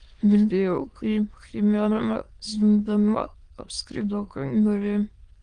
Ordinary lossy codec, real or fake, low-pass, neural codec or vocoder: Opus, 24 kbps; fake; 9.9 kHz; autoencoder, 22.05 kHz, a latent of 192 numbers a frame, VITS, trained on many speakers